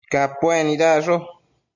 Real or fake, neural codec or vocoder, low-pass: real; none; 7.2 kHz